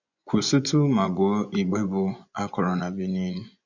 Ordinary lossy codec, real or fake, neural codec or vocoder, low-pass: none; fake; vocoder, 24 kHz, 100 mel bands, Vocos; 7.2 kHz